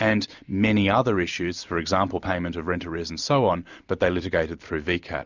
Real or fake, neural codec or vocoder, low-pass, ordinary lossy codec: real; none; 7.2 kHz; Opus, 64 kbps